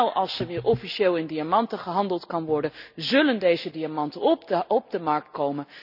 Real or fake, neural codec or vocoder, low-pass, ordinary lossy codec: real; none; 5.4 kHz; none